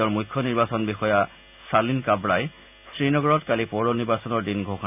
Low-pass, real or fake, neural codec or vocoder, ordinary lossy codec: 3.6 kHz; real; none; none